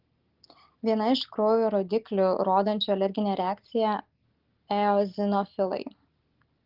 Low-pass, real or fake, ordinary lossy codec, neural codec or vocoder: 5.4 kHz; real; Opus, 16 kbps; none